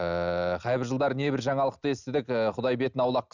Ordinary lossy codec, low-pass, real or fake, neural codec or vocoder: none; 7.2 kHz; real; none